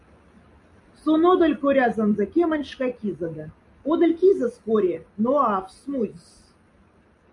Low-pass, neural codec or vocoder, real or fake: 10.8 kHz; none; real